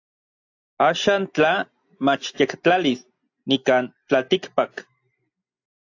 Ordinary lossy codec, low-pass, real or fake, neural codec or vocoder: AAC, 48 kbps; 7.2 kHz; real; none